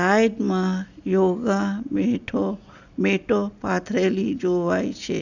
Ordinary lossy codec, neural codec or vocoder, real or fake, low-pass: none; none; real; 7.2 kHz